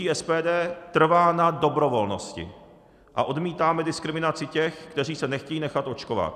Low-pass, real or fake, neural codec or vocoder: 14.4 kHz; real; none